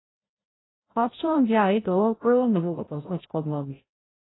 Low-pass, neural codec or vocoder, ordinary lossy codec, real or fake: 7.2 kHz; codec, 16 kHz, 0.5 kbps, FreqCodec, larger model; AAC, 16 kbps; fake